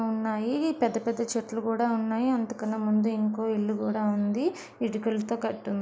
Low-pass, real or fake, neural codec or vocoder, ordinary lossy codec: none; real; none; none